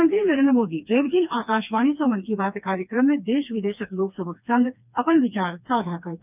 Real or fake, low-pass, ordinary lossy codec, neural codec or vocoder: fake; 3.6 kHz; none; codec, 16 kHz, 2 kbps, FreqCodec, smaller model